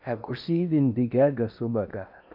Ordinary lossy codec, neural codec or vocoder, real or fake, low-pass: none; codec, 16 kHz in and 24 kHz out, 0.6 kbps, FocalCodec, streaming, 4096 codes; fake; 5.4 kHz